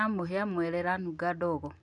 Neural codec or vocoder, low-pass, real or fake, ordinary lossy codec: none; 10.8 kHz; real; none